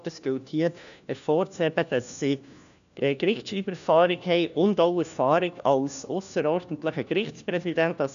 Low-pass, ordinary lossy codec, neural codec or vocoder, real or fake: 7.2 kHz; none; codec, 16 kHz, 1 kbps, FunCodec, trained on LibriTTS, 50 frames a second; fake